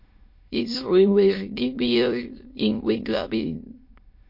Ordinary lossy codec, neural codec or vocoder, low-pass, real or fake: MP3, 32 kbps; autoencoder, 22.05 kHz, a latent of 192 numbers a frame, VITS, trained on many speakers; 5.4 kHz; fake